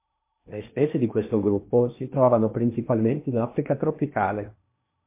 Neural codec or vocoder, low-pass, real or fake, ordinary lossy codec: codec, 16 kHz in and 24 kHz out, 0.8 kbps, FocalCodec, streaming, 65536 codes; 3.6 kHz; fake; MP3, 24 kbps